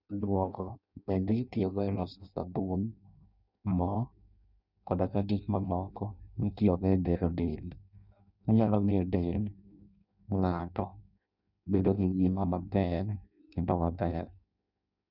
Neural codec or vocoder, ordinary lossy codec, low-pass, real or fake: codec, 16 kHz in and 24 kHz out, 0.6 kbps, FireRedTTS-2 codec; none; 5.4 kHz; fake